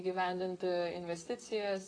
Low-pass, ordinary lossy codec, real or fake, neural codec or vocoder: 9.9 kHz; AAC, 32 kbps; fake; vocoder, 44.1 kHz, 128 mel bands, Pupu-Vocoder